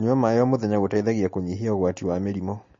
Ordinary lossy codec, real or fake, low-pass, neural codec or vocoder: MP3, 32 kbps; real; 7.2 kHz; none